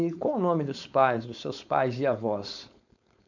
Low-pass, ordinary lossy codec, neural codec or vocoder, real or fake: 7.2 kHz; none; codec, 16 kHz, 4.8 kbps, FACodec; fake